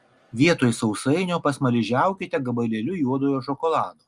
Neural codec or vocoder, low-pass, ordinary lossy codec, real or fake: none; 10.8 kHz; Opus, 32 kbps; real